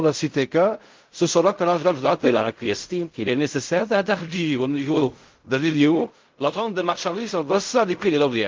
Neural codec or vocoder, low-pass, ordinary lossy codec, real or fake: codec, 16 kHz in and 24 kHz out, 0.4 kbps, LongCat-Audio-Codec, fine tuned four codebook decoder; 7.2 kHz; Opus, 32 kbps; fake